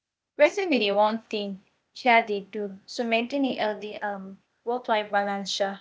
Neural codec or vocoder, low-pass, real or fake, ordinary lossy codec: codec, 16 kHz, 0.8 kbps, ZipCodec; none; fake; none